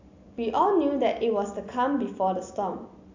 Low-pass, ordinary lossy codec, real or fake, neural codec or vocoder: 7.2 kHz; AAC, 48 kbps; real; none